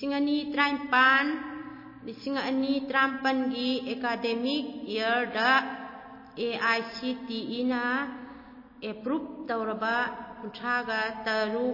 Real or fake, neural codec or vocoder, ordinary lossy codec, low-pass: real; none; MP3, 24 kbps; 5.4 kHz